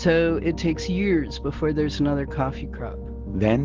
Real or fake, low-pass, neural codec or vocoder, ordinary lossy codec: real; 7.2 kHz; none; Opus, 16 kbps